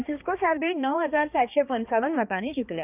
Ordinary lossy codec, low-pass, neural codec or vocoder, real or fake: none; 3.6 kHz; codec, 16 kHz, 2 kbps, X-Codec, HuBERT features, trained on balanced general audio; fake